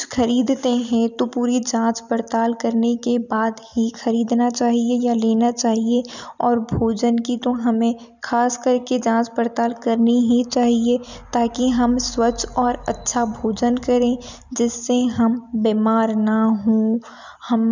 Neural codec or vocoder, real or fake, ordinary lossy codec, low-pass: none; real; none; 7.2 kHz